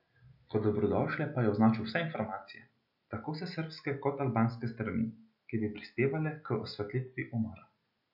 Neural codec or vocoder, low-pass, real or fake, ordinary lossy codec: none; 5.4 kHz; real; none